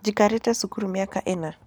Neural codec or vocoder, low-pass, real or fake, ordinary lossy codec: none; none; real; none